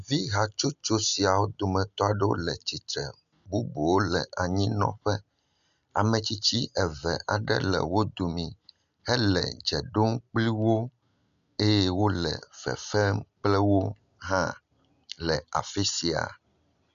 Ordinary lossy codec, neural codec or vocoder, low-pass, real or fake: MP3, 96 kbps; none; 7.2 kHz; real